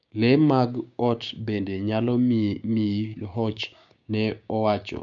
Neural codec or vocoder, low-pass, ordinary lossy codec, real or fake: codec, 16 kHz, 6 kbps, DAC; 7.2 kHz; none; fake